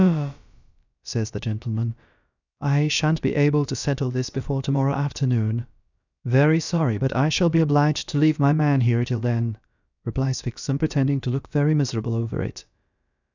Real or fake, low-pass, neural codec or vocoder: fake; 7.2 kHz; codec, 16 kHz, about 1 kbps, DyCAST, with the encoder's durations